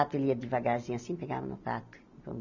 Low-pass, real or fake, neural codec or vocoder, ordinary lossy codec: 7.2 kHz; real; none; none